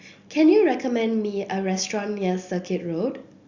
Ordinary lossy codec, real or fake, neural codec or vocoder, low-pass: Opus, 64 kbps; real; none; 7.2 kHz